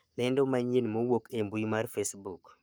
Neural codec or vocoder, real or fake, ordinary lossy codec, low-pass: codec, 44.1 kHz, 7.8 kbps, Pupu-Codec; fake; none; none